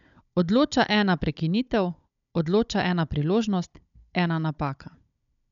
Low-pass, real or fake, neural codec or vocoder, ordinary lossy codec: 7.2 kHz; fake; codec, 16 kHz, 16 kbps, FunCodec, trained on Chinese and English, 50 frames a second; none